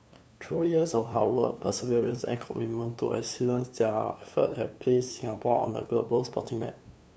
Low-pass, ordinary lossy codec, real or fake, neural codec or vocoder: none; none; fake; codec, 16 kHz, 2 kbps, FunCodec, trained on LibriTTS, 25 frames a second